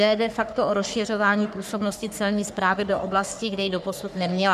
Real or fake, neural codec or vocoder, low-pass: fake; codec, 44.1 kHz, 3.4 kbps, Pupu-Codec; 14.4 kHz